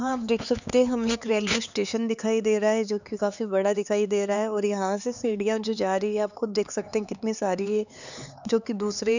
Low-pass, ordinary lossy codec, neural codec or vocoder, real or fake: 7.2 kHz; none; codec, 16 kHz, 4 kbps, X-Codec, HuBERT features, trained on LibriSpeech; fake